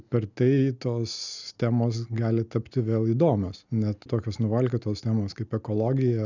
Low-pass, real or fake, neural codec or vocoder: 7.2 kHz; real; none